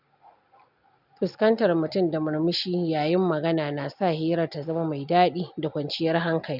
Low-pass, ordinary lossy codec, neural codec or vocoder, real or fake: 5.4 kHz; none; none; real